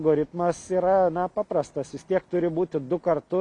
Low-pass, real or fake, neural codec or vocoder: 10.8 kHz; real; none